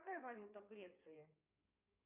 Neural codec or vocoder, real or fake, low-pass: codec, 16 kHz in and 24 kHz out, 2.2 kbps, FireRedTTS-2 codec; fake; 3.6 kHz